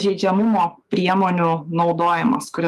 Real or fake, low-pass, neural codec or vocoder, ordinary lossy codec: fake; 14.4 kHz; autoencoder, 48 kHz, 128 numbers a frame, DAC-VAE, trained on Japanese speech; Opus, 32 kbps